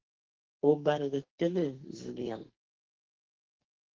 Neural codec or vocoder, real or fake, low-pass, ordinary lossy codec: codec, 44.1 kHz, 2.6 kbps, SNAC; fake; 7.2 kHz; Opus, 16 kbps